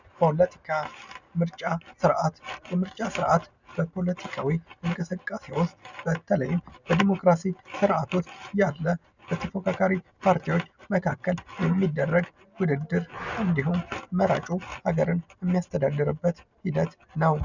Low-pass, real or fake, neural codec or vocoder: 7.2 kHz; real; none